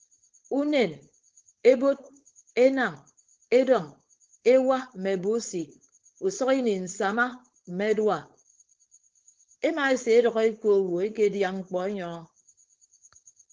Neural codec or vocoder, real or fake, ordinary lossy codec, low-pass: codec, 16 kHz, 4.8 kbps, FACodec; fake; Opus, 16 kbps; 7.2 kHz